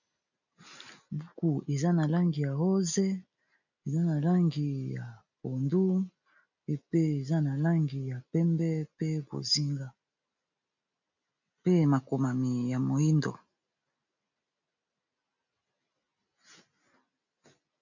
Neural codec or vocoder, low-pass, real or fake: none; 7.2 kHz; real